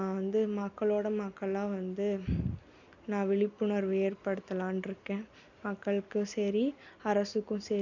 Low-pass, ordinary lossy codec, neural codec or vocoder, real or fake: 7.2 kHz; none; none; real